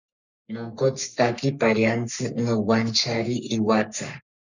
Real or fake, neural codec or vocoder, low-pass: fake; codec, 44.1 kHz, 3.4 kbps, Pupu-Codec; 7.2 kHz